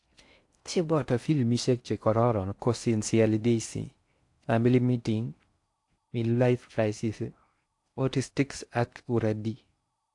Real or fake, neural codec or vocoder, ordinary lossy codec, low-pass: fake; codec, 16 kHz in and 24 kHz out, 0.6 kbps, FocalCodec, streaming, 4096 codes; AAC, 64 kbps; 10.8 kHz